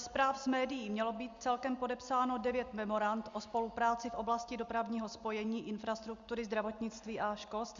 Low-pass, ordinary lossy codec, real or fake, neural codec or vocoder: 7.2 kHz; Opus, 64 kbps; real; none